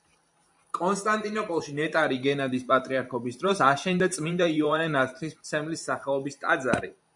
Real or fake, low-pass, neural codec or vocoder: fake; 10.8 kHz; vocoder, 44.1 kHz, 128 mel bands every 512 samples, BigVGAN v2